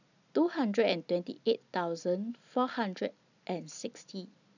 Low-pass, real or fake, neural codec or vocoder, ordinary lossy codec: 7.2 kHz; real; none; none